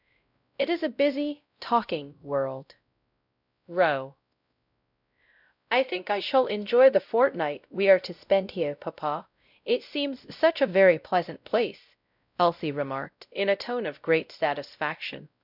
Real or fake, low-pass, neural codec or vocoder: fake; 5.4 kHz; codec, 16 kHz, 0.5 kbps, X-Codec, WavLM features, trained on Multilingual LibriSpeech